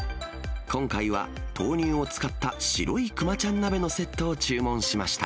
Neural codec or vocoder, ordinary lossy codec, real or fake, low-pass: none; none; real; none